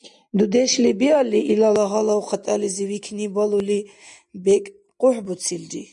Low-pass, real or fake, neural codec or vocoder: 10.8 kHz; real; none